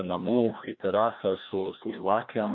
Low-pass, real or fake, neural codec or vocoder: 7.2 kHz; fake; codec, 16 kHz, 1 kbps, FreqCodec, larger model